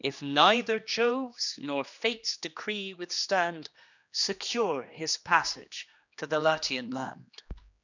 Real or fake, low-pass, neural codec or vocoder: fake; 7.2 kHz; codec, 16 kHz, 2 kbps, X-Codec, HuBERT features, trained on general audio